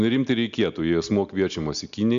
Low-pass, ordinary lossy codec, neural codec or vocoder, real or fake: 7.2 kHz; MP3, 64 kbps; none; real